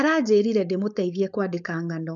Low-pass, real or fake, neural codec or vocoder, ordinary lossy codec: 7.2 kHz; fake; codec, 16 kHz, 4.8 kbps, FACodec; none